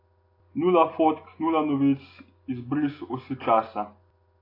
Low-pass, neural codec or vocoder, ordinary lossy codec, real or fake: 5.4 kHz; none; AAC, 32 kbps; real